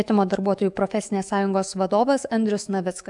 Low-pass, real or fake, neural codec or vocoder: 10.8 kHz; fake; autoencoder, 48 kHz, 128 numbers a frame, DAC-VAE, trained on Japanese speech